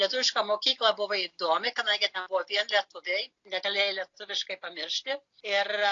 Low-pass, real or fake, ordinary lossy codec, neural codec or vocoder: 7.2 kHz; real; AAC, 48 kbps; none